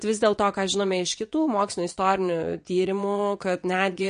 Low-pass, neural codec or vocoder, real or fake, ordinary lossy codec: 9.9 kHz; vocoder, 22.05 kHz, 80 mel bands, WaveNeXt; fake; MP3, 48 kbps